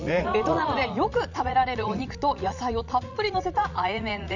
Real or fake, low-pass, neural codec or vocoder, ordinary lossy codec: fake; 7.2 kHz; vocoder, 44.1 kHz, 80 mel bands, Vocos; none